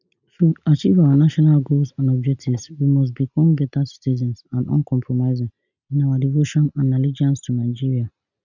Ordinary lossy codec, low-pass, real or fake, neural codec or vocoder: none; 7.2 kHz; real; none